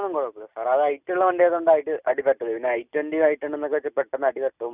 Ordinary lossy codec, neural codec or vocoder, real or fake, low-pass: none; none; real; 3.6 kHz